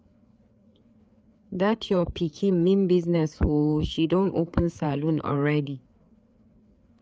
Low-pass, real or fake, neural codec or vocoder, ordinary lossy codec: none; fake; codec, 16 kHz, 4 kbps, FreqCodec, larger model; none